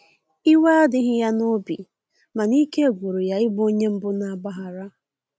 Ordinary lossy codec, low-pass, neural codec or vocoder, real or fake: none; none; none; real